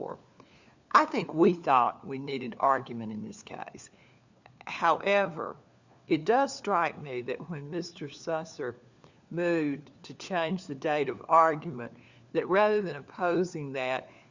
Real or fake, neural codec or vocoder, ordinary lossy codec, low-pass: fake; codec, 16 kHz, 4 kbps, FunCodec, trained on LibriTTS, 50 frames a second; Opus, 64 kbps; 7.2 kHz